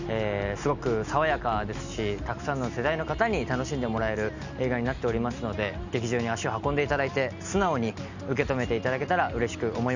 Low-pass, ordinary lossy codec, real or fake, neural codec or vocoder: 7.2 kHz; none; real; none